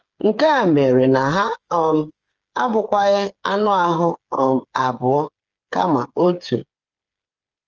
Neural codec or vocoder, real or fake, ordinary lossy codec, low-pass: codec, 16 kHz, 8 kbps, FreqCodec, smaller model; fake; Opus, 24 kbps; 7.2 kHz